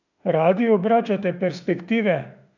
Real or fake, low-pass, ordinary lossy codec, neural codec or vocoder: fake; 7.2 kHz; none; autoencoder, 48 kHz, 32 numbers a frame, DAC-VAE, trained on Japanese speech